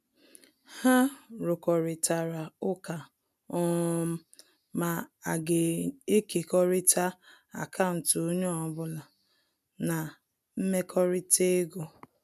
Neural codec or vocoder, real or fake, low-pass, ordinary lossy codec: none; real; 14.4 kHz; none